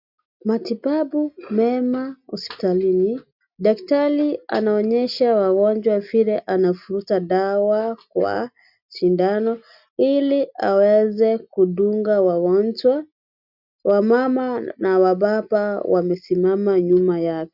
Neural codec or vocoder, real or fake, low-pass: none; real; 5.4 kHz